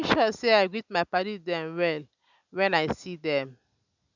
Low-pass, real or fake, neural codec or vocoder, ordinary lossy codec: 7.2 kHz; real; none; none